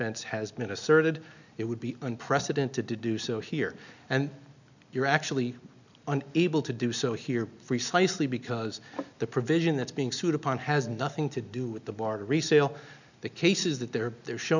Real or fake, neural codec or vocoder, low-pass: real; none; 7.2 kHz